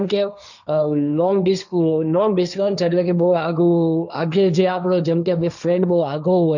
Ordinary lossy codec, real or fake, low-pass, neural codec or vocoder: none; fake; 7.2 kHz; codec, 16 kHz, 1.1 kbps, Voila-Tokenizer